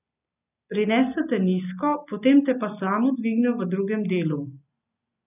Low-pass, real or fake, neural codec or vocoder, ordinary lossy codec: 3.6 kHz; real; none; none